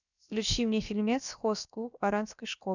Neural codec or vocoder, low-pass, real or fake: codec, 16 kHz, about 1 kbps, DyCAST, with the encoder's durations; 7.2 kHz; fake